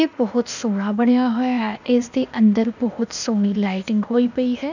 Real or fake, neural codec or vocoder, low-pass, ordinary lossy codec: fake; codec, 16 kHz, 0.8 kbps, ZipCodec; 7.2 kHz; none